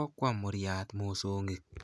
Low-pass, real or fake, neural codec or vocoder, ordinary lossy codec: none; real; none; none